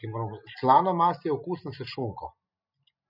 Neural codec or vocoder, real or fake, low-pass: none; real; 5.4 kHz